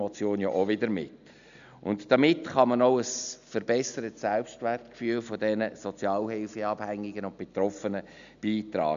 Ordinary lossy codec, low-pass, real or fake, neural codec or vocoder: MP3, 96 kbps; 7.2 kHz; real; none